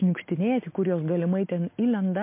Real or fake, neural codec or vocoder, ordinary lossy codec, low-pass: real; none; MP3, 24 kbps; 3.6 kHz